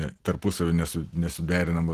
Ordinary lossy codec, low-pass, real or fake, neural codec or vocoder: Opus, 16 kbps; 14.4 kHz; real; none